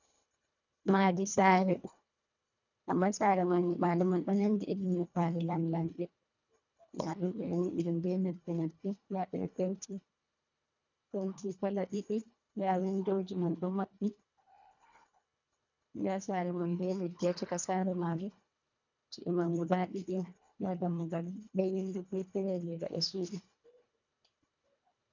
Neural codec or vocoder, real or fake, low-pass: codec, 24 kHz, 1.5 kbps, HILCodec; fake; 7.2 kHz